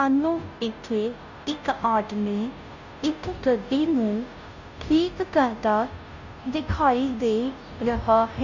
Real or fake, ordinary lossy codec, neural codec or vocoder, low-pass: fake; none; codec, 16 kHz, 0.5 kbps, FunCodec, trained on Chinese and English, 25 frames a second; 7.2 kHz